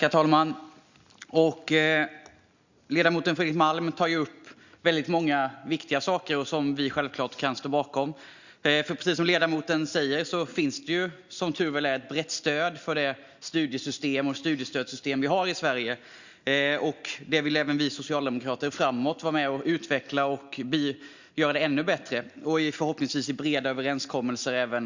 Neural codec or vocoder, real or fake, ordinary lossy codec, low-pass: none; real; Opus, 64 kbps; 7.2 kHz